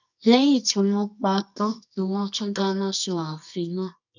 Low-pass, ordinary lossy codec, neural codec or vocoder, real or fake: 7.2 kHz; none; codec, 24 kHz, 0.9 kbps, WavTokenizer, medium music audio release; fake